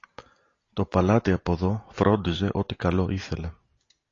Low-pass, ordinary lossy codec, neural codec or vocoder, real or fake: 7.2 kHz; AAC, 32 kbps; none; real